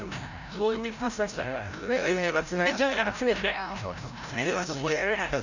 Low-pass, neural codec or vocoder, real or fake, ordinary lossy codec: 7.2 kHz; codec, 16 kHz, 0.5 kbps, FreqCodec, larger model; fake; none